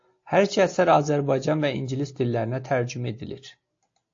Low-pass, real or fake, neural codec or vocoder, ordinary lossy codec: 7.2 kHz; real; none; AAC, 48 kbps